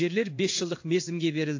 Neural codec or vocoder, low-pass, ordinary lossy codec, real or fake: codec, 16 kHz in and 24 kHz out, 1 kbps, XY-Tokenizer; 7.2 kHz; AAC, 48 kbps; fake